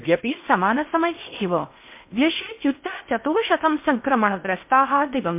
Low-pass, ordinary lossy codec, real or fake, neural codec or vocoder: 3.6 kHz; MP3, 32 kbps; fake; codec, 16 kHz in and 24 kHz out, 0.6 kbps, FocalCodec, streaming, 4096 codes